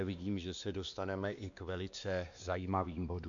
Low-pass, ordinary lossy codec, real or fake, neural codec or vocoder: 7.2 kHz; MP3, 64 kbps; fake; codec, 16 kHz, 2 kbps, X-Codec, WavLM features, trained on Multilingual LibriSpeech